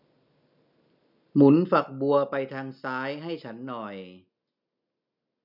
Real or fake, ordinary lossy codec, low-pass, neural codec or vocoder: real; none; 5.4 kHz; none